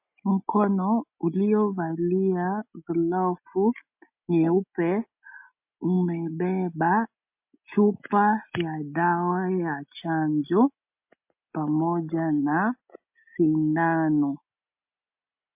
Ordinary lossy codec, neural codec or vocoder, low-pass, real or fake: MP3, 32 kbps; none; 3.6 kHz; real